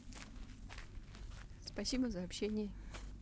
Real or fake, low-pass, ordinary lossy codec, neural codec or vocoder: real; none; none; none